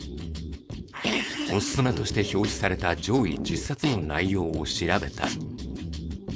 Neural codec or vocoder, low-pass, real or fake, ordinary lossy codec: codec, 16 kHz, 4.8 kbps, FACodec; none; fake; none